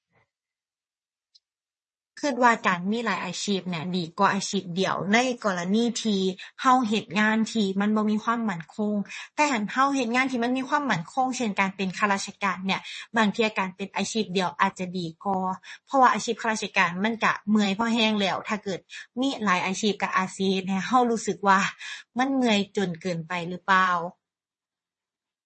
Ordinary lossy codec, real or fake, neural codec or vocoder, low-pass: MP3, 32 kbps; fake; vocoder, 22.05 kHz, 80 mel bands, WaveNeXt; 9.9 kHz